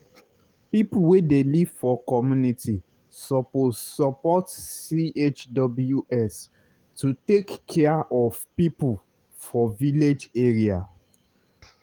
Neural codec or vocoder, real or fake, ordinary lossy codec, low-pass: codec, 44.1 kHz, 7.8 kbps, DAC; fake; Opus, 32 kbps; 19.8 kHz